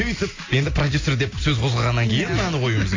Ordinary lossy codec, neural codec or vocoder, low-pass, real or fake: AAC, 32 kbps; none; 7.2 kHz; real